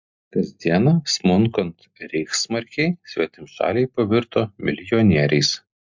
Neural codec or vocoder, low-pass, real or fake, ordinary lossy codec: none; 7.2 kHz; real; MP3, 64 kbps